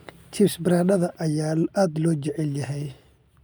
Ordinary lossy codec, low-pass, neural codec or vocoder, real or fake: none; none; none; real